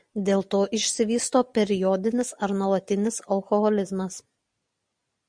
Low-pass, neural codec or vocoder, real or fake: 9.9 kHz; none; real